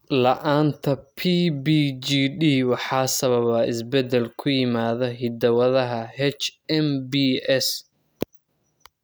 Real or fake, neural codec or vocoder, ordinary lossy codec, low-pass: real; none; none; none